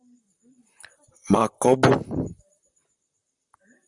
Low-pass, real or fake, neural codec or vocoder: 10.8 kHz; fake; vocoder, 44.1 kHz, 128 mel bands, Pupu-Vocoder